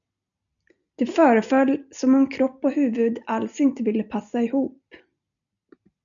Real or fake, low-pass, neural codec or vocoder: real; 7.2 kHz; none